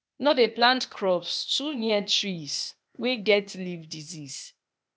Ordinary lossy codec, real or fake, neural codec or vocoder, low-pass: none; fake; codec, 16 kHz, 0.8 kbps, ZipCodec; none